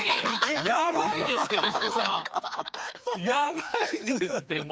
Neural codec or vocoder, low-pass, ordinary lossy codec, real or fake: codec, 16 kHz, 2 kbps, FreqCodec, larger model; none; none; fake